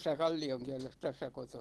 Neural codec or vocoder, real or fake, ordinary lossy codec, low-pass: none; real; Opus, 16 kbps; 19.8 kHz